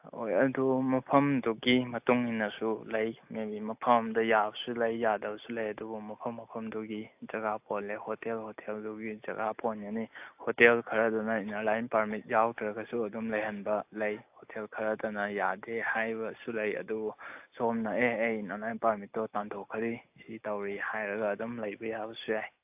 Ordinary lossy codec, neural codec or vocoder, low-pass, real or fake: AAC, 32 kbps; none; 3.6 kHz; real